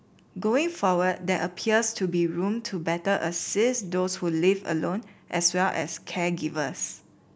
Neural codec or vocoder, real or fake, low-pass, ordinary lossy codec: none; real; none; none